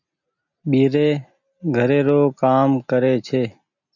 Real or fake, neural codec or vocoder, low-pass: real; none; 7.2 kHz